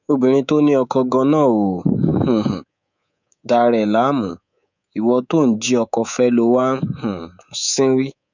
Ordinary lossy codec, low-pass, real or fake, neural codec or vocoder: none; 7.2 kHz; fake; codec, 24 kHz, 3.1 kbps, DualCodec